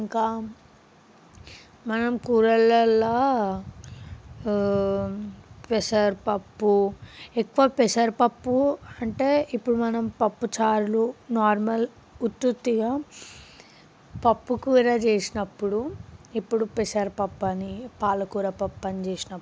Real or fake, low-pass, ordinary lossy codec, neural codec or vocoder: real; none; none; none